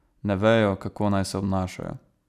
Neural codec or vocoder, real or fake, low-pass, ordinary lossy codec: none; real; 14.4 kHz; none